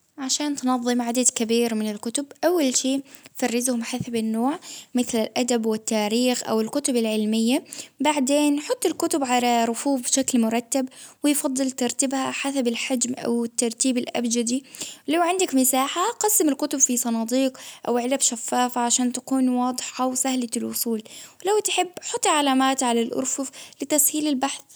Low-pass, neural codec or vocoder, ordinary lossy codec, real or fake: none; none; none; real